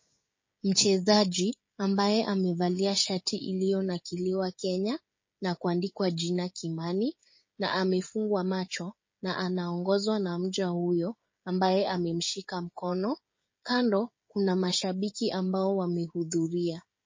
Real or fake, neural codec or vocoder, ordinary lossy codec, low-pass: fake; codec, 16 kHz, 16 kbps, FreqCodec, smaller model; MP3, 32 kbps; 7.2 kHz